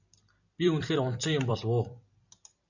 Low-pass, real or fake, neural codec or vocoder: 7.2 kHz; fake; vocoder, 44.1 kHz, 128 mel bands every 256 samples, BigVGAN v2